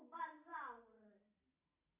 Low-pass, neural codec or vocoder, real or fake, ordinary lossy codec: 3.6 kHz; none; real; AAC, 24 kbps